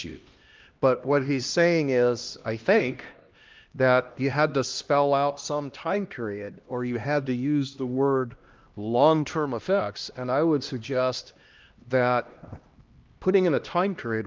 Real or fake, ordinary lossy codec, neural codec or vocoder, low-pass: fake; Opus, 24 kbps; codec, 16 kHz, 1 kbps, X-Codec, HuBERT features, trained on LibriSpeech; 7.2 kHz